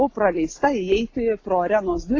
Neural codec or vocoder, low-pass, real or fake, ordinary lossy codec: none; 7.2 kHz; real; AAC, 32 kbps